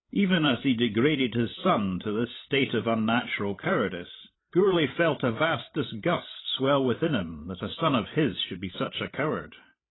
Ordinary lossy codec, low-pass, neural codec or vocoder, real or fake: AAC, 16 kbps; 7.2 kHz; codec, 16 kHz, 16 kbps, FreqCodec, larger model; fake